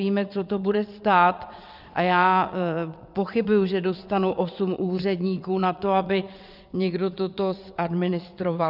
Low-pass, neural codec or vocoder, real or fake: 5.4 kHz; vocoder, 22.05 kHz, 80 mel bands, WaveNeXt; fake